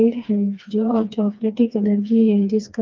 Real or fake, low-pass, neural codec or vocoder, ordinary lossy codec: fake; 7.2 kHz; codec, 16 kHz, 2 kbps, FreqCodec, smaller model; Opus, 32 kbps